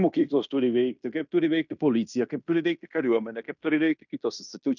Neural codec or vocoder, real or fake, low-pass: codec, 16 kHz in and 24 kHz out, 0.9 kbps, LongCat-Audio-Codec, fine tuned four codebook decoder; fake; 7.2 kHz